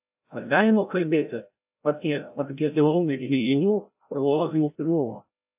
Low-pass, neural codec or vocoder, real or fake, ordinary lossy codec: 3.6 kHz; codec, 16 kHz, 0.5 kbps, FreqCodec, larger model; fake; AAC, 32 kbps